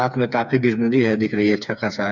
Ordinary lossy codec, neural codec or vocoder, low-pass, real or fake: none; codec, 16 kHz, 4 kbps, FreqCodec, smaller model; 7.2 kHz; fake